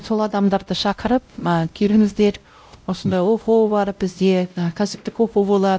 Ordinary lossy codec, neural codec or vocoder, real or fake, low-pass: none; codec, 16 kHz, 0.5 kbps, X-Codec, WavLM features, trained on Multilingual LibriSpeech; fake; none